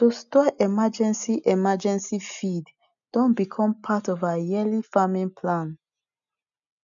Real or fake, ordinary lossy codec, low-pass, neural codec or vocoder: real; none; 7.2 kHz; none